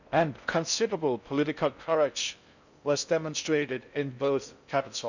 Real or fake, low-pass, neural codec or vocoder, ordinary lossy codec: fake; 7.2 kHz; codec, 16 kHz in and 24 kHz out, 0.6 kbps, FocalCodec, streaming, 4096 codes; none